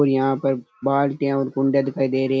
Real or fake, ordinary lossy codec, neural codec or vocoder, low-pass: real; none; none; none